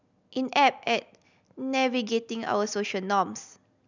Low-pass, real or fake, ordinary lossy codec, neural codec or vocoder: 7.2 kHz; real; none; none